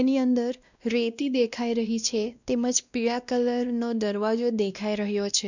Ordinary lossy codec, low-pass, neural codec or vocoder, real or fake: none; 7.2 kHz; codec, 16 kHz, 1 kbps, X-Codec, WavLM features, trained on Multilingual LibriSpeech; fake